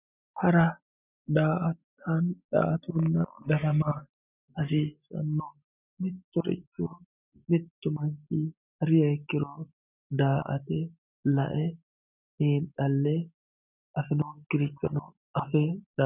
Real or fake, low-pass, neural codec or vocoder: real; 3.6 kHz; none